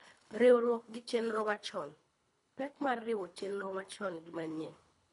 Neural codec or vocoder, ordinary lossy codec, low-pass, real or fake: codec, 24 kHz, 3 kbps, HILCodec; none; 10.8 kHz; fake